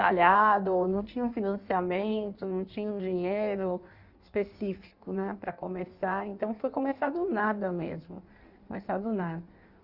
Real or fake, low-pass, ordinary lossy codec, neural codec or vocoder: fake; 5.4 kHz; Opus, 64 kbps; codec, 16 kHz in and 24 kHz out, 1.1 kbps, FireRedTTS-2 codec